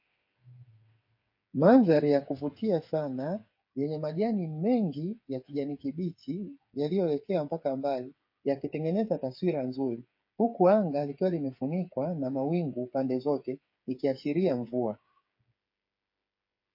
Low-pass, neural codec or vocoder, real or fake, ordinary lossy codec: 5.4 kHz; codec, 16 kHz, 8 kbps, FreqCodec, smaller model; fake; MP3, 32 kbps